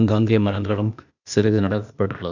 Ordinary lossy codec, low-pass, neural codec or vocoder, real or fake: none; 7.2 kHz; codec, 16 kHz, 0.8 kbps, ZipCodec; fake